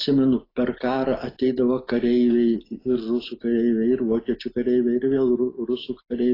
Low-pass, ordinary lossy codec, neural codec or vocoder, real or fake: 5.4 kHz; AAC, 24 kbps; none; real